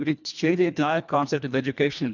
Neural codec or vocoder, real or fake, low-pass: codec, 24 kHz, 1.5 kbps, HILCodec; fake; 7.2 kHz